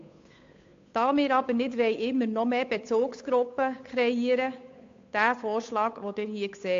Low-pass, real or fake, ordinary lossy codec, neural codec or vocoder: 7.2 kHz; fake; AAC, 48 kbps; codec, 16 kHz, 8 kbps, FunCodec, trained on Chinese and English, 25 frames a second